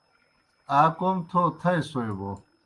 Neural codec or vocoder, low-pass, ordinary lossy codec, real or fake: none; 10.8 kHz; Opus, 24 kbps; real